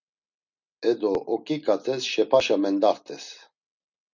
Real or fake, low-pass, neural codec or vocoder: real; 7.2 kHz; none